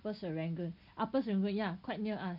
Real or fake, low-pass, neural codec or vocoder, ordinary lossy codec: real; 5.4 kHz; none; none